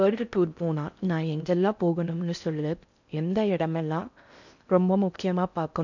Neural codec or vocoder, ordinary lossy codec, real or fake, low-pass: codec, 16 kHz in and 24 kHz out, 0.6 kbps, FocalCodec, streaming, 4096 codes; none; fake; 7.2 kHz